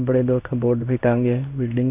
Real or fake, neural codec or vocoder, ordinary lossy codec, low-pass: fake; codec, 16 kHz in and 24 kHz out, 1 kbps, XY-Tokenizer; none; 3.6 kHz